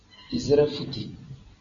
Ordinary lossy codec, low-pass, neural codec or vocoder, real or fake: AAC, 64 kbps; 7.2 kHz; none; real